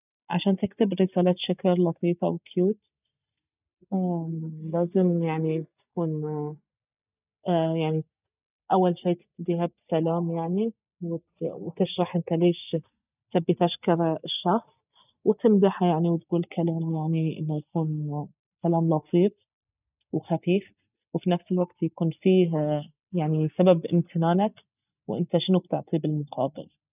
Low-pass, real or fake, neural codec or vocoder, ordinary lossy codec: 3.6 kHz; real; none; none